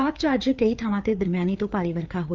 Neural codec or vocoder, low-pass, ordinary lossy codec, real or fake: codec, 16 kHz, 4 kbps, FreqCodec, larger model; 7.2 kHz; Opus, 32 kbps; fake